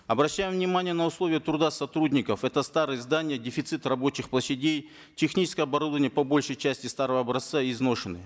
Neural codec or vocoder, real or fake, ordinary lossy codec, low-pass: none; real; none; none